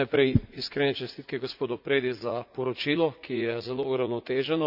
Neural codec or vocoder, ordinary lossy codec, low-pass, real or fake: vocoder, 44.1 kHz, 80 mel bands, Vocos; none; 5.4 kHz; fake